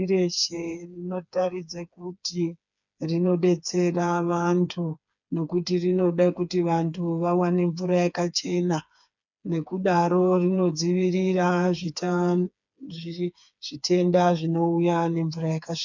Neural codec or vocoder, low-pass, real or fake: codec, 16 kHz, 4 kbps, FreqCodec, smaller model; 7.2 kHz; fake